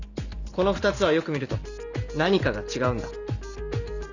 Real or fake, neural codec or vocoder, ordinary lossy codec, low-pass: real; none; none; 7.2 kHz